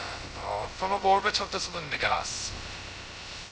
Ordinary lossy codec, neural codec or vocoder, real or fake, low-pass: none; codec, 16 kHz, 0.2 kbps, FocalCodec; fake; none